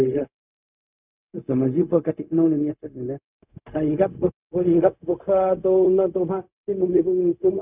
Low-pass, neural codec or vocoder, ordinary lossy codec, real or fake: 3.6 kHz; codec, 16 kHz, 0.4 kbps, LongCat-Audio-Codec; none; fake